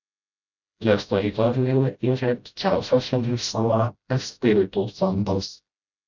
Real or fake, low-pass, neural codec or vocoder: fake; 7.2 kHz; codec, 16 kHz, 0.5 kbps, FreqCodec, smaller model